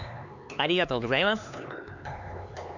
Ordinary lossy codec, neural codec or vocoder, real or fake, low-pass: none; codec, 16 kHz, 4 kbps, X-Codec, HuBERT features, trained on LibriSpeech; fake; 7.2 kHz